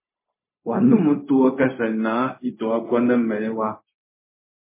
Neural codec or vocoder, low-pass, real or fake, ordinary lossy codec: codec, 16 kHz, 0.4 kbps, LongCat-Audio-Codec; 3.6 kHz; fake; MP3, 16 kbps